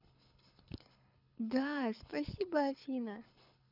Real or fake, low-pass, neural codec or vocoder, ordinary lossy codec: fake; 5.4 kHz; codec, 24 kHz, 6 kbps, HILCodec; none